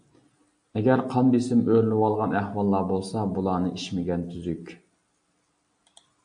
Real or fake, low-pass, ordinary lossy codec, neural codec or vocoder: real; 9.9 kHz; AAC, 64 kbps; none